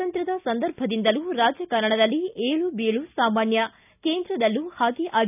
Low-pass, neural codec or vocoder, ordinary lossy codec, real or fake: 3.6 kHz; none; none; real